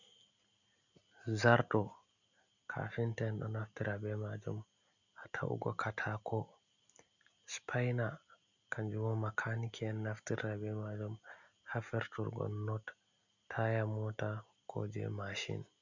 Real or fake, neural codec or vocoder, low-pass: real; none; 7.2 kHz